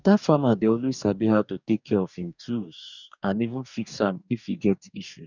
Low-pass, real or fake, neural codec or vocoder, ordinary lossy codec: 7.2 kHz; fake; codec, 44.1 kHz, 2.6 kbps, DAC; none